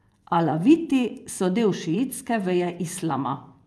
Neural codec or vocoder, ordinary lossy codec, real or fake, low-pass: vocoder, 24 kHz, 100 mel bands, Vocos; none; fake; none